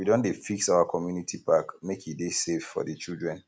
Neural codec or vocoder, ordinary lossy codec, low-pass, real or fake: none; none; none; real